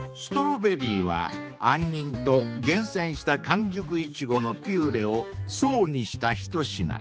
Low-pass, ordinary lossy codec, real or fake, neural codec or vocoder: none; none; fake; codec, 16 kHz, 2 kbps, X-Codec, HuBERT features, trained on general audio